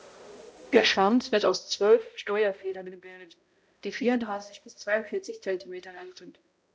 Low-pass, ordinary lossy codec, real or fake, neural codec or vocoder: none; none; fake; codec, 16 kHz, 0.5 kbps, X-Codec, HuBERT features, trained on balanced general audio